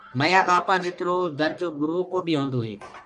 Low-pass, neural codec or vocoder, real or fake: 10.8 kHz; codec, 44.1 kHz, 1.7 kbps, Pupu-Codec; fake